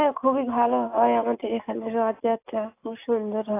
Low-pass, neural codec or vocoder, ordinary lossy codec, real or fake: 3.6 kHz; none; AAC, 24 kbps; real